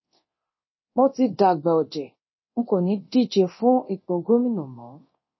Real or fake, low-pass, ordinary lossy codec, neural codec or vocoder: fake; 7.2 kHz; MP3, 24 kbps; codec, 24 kHz, 0.9 kbps, DualCodec